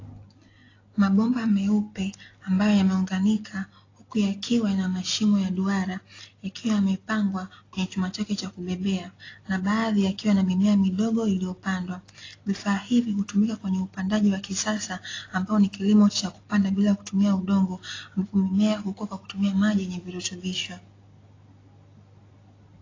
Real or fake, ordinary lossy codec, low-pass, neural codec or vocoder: real; AAC, 32 kbps; 7.2 kHz; none